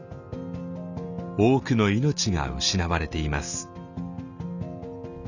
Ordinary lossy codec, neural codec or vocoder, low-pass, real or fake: none; none; 7.2 kHz; real